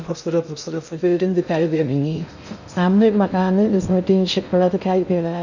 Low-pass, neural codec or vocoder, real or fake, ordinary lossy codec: 7.2 kHz; codec, 16 kHz in and 24 kHz out, 0.6 kbps, FocalCodec, streaming, 2048 codes; fake; none